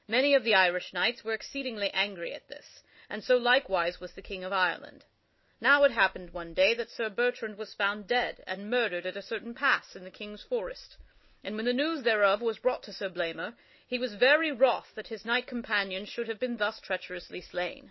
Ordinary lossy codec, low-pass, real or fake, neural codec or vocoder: MP3, 24 kbps; 7.2 kHz; real; none